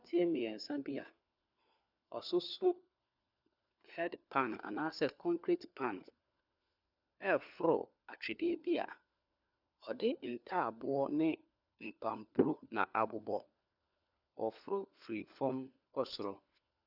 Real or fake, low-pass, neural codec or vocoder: fake; 5.4 kHz; codec, 16 kHz, 4 kbps, FunCodec, trained on LibriTTS, 50 frames a second